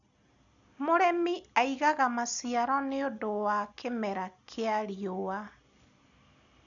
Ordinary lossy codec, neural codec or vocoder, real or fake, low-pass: none; none; real; 7.2 kHz